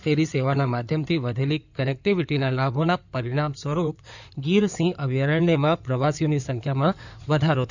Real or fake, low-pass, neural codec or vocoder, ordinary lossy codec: fake; 7.2 kHz; codec, 16 kHz in and 24 kHz out, 2.2 kbps, FireRedTTS-2 codec; none